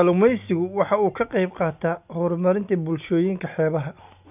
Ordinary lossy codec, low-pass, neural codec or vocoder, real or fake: none; 3.6 kHz; none; real